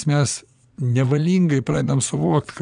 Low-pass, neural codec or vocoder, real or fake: 9.9 kHz; vocoder, 22.05 kHz, 80 mel bands, Vocos; fake